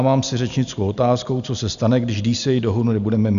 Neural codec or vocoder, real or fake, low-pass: none; real; 7.2 kHz